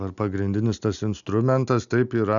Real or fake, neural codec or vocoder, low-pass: real; none; 7.2 kHz